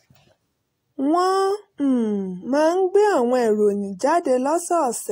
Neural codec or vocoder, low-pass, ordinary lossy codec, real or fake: none; 19.8 kHz; AAC, 48 kbps; real